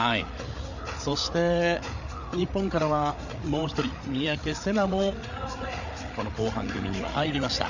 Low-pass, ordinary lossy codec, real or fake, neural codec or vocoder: 7.2 kHz; none; fake; codec, 16 kHz, 8 kbps, FreqCodec, larger model